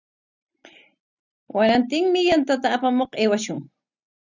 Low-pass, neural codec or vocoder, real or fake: 7.2 kHz; none; real